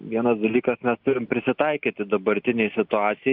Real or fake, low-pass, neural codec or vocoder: real; 5.4 kHz; none